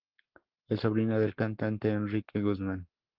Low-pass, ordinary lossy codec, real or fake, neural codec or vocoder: 5.4 kHz; Opus, 16 kbps; fake; codec, 44.1 kHz, 7.8 kbps, Pupu-Codec